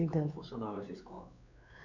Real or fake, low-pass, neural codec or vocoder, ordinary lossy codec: fake; 7.2 kHz; codec, 16 kHz, 4 kbps, X-Codec, WavLM features, trained on Multilingual LibriSpeech; none